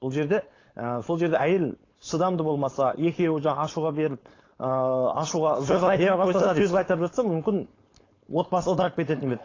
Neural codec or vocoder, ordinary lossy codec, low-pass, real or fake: codec, 16 kHz, 4.8 kbps, FACodec; AAC, 32 kbps; 7.2 kHz; fake